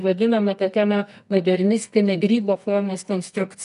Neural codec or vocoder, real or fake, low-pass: codec, 24 kHz, 0.9 kbps, WavTokenizer, medium music audio release; fake; 10.8 kHz